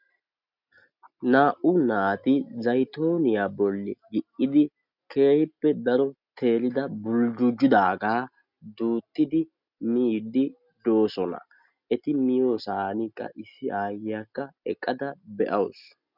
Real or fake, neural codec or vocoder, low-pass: real; none; 5.4 kHz